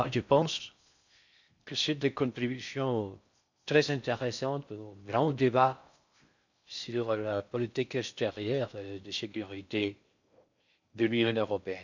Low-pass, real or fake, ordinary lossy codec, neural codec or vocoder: 7.2 kHz; fake; none; codec, 16 kHz in and 24 kHz out, 0.6 kbps, FocalCodec, streaming, 4096 codes